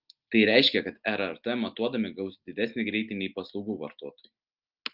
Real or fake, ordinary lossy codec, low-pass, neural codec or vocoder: real; Opus, 32 kbps; 5.4 kHz; none